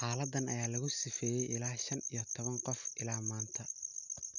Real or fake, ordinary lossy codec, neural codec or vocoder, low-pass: real; none; none; 7.2 kHz